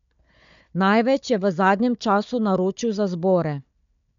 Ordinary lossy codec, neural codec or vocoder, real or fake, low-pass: MP3, 64 kbps; codec, 16 kHz, 4 kbps, FunCodec, trained on Chinese and English, 50 frames a second; fake; 7.2 kHz